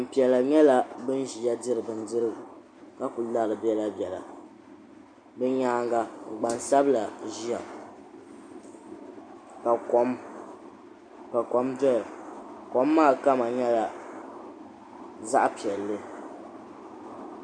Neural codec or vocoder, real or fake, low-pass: none; real; 9.9 kHz